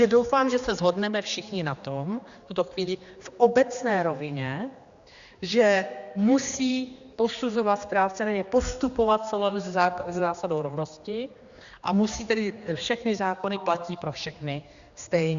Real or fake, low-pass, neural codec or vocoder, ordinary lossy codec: fake; 7.2 kHz; codec, 16 kHz, 2 kbps, X-Codec, HuBERT features, trained on general audio; Opus, 64 kbps